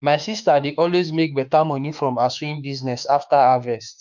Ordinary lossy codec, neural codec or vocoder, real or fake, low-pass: none; autoencoder, 48 kHz, 32 numbers a frame, DAC-VAE, trained on Japanese speech; fake; 7.2 kHz